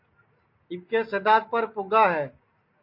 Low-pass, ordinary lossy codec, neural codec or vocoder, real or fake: 5.4 kHz; MP3, 32 kbps; none; real